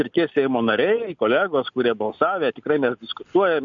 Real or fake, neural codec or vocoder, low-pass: real; none; 7.2 kHz